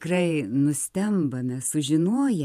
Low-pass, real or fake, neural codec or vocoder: 14.4 kHz; fake; vocoder, 48 kHz, 128 mel bands, Vocos